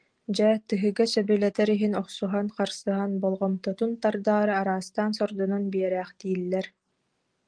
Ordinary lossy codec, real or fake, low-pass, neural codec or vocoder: Opus, 24 kbps; real; 9.9 kHz; none